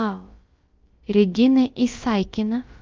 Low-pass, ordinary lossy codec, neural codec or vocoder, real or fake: 7.2 kHz; Opus, 32 kbps; codec, 16 kHz, about 1 kbps, DyCAST, with the encoder's durations; fake